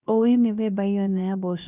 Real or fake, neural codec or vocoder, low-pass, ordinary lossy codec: fake; codec, 16 kHz, 0.3 kbps, FocalCodec; 3.6 kHz; none